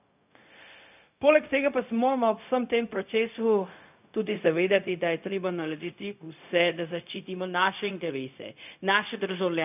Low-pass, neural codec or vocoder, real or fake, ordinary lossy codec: 3.6 kHz; codec, 16 kHz, 0.4 kbps, LongCat-Audio-Codec; fake; none